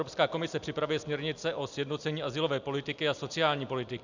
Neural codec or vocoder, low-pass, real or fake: none; 7.2 kHz; real